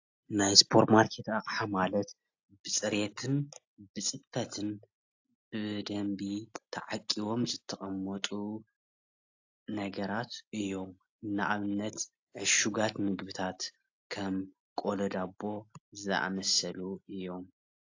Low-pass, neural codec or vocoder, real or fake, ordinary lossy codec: 7.2 kHz; none; real; AAC, 48 kbps